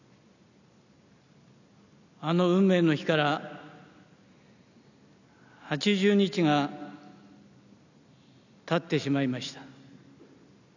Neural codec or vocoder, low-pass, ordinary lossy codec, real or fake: none; 7.2 kHz; none; real